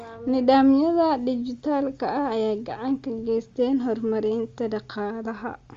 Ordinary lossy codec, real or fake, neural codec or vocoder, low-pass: Opus, 32 kbps; real; none; 7.2 kHz